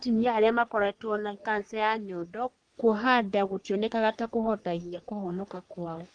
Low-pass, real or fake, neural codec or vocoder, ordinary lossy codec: 9.9 kHz; fake; codec, 44.1 kHz, 3.4 kbps, Pupu-Codec; Opus, 32 kbps